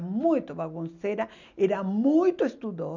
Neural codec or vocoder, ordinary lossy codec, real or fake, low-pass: none; none; real; 7.2 kHz